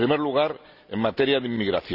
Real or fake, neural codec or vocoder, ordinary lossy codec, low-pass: real; none; none; 5.4 kHz